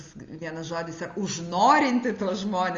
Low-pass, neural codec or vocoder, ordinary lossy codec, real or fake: 7.2 kHz; none; Opus, 32 kbps; real